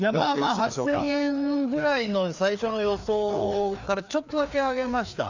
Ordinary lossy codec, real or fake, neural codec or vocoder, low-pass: none; fake; codec, 16 kHz, 2 kbps, FreqCodec, larger model; 7.2 kHz